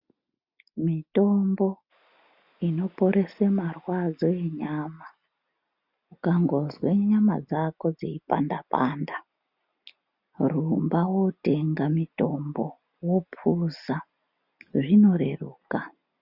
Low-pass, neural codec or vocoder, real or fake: 5.4 kHz; none; real